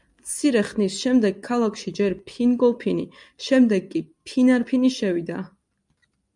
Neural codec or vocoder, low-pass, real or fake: none; 10.8 kHz; real